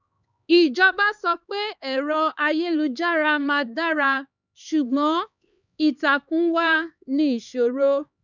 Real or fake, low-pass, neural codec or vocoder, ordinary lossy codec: fake; 7.2 kHz; codec, 16 kHz, 4 kbps, X-Codec, HuBERT features, trained on LibriSpeech; none